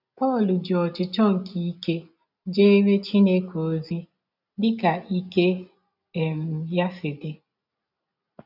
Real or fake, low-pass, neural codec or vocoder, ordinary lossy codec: real; 5.4 kHz; none; none